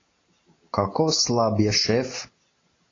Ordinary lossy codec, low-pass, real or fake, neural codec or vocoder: AAC, 32 kbps; 7.2 kHz; real; none